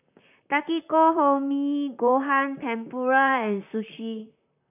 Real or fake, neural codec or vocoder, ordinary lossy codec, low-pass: fake; vocoder, 44.1 kHz, 128 mel bands, Pupu-Vocoder; none; 3.6 kHz